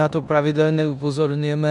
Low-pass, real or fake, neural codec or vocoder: 10.8 kHz; fake; codec, 16 kHz in and 24 kHz out, 0.9 kbps, LongCat-Audio-Codec, four codebook decoder